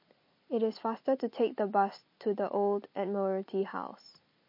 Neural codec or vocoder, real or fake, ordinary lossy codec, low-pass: none; real; MP3, 32 kbps; 5.4 kHz